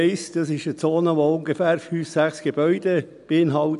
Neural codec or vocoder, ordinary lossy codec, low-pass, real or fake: none; none; 10.8 kHz; real